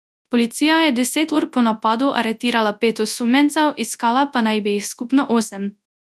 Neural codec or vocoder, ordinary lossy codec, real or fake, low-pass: codec, 24 kHz, 0.9 kbps, WavTokenizer, large speech release; none; fake; none